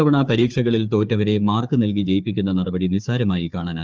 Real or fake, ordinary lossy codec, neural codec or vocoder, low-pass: fake; Opus, 24 kbps; codec, 16 kHz, 4 kbps, FunCodec, trained on Chinese and English, 50 frames a second; 7.2 kHz